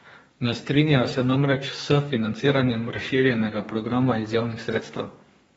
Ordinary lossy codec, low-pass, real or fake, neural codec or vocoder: AAC, 24 kbps; 14.4 kHz; fake; codec, 32 kHz, 1.9 kbps, SNAC